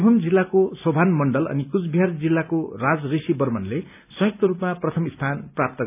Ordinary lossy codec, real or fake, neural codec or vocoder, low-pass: none; real; none; 3.6 kHz